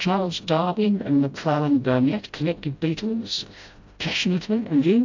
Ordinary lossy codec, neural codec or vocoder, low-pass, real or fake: AAC, 48 kbps; codec, 16 kHz, 0.5 kbps, FreqCodec, smaller model; 7.2 kHz; fake